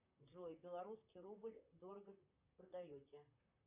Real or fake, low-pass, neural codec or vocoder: fake; 3.6 kHz; vocoder, 44.1 kHz, 128 mel bands, Pupu-Vocoder